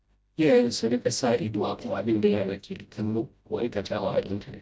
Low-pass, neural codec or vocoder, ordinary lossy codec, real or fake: none; codec, 16 kHz, 0.5 kbps, FreqCodec, smaller model; none; fake